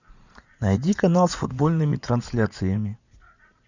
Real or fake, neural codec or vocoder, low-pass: fake; vocoder, 44.1 kHz, 80 mel bands, Vocos; 7.2 kHz